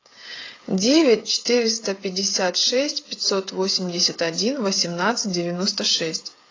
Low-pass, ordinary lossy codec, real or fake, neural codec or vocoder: 7.2 kHz; AAC, 32 kbps; fake; codec, 16 kHz, 16 kbps, FreqCodec, smaller model